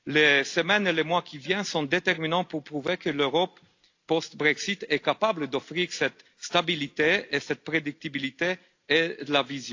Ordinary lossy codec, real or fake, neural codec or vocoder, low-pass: AAC, 48 kbps; real; none; 7.2 kHz